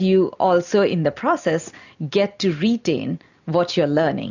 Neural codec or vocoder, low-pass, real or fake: none; 7.2 kHz; real